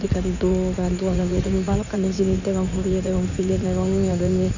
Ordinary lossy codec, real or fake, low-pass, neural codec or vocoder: none; fake; 7.2 kHz; codec, 16 kHz in and 24 kHz out, 2.2 kbps, FireRedTTS-2 codec